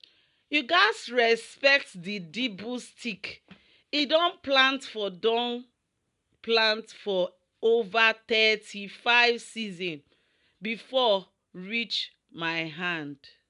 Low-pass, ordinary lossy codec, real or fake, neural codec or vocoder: 10.8 kHz; none; real; none